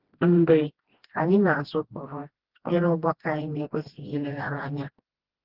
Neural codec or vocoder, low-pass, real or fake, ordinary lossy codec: codec, 16 kHz, 1 kbps, FreqCodec, smaller model; 5.4 kHz; fake; Opus, 16 kbps